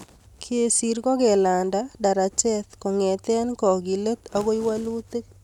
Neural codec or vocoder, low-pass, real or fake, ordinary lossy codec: none; 19.8 kHz; real; none